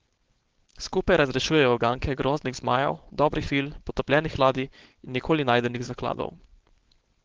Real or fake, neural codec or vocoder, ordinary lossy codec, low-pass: fake; codec, 16 kHz, 4.8 kbps, FACodec; Opus, 16 kbps; 7.2 kHz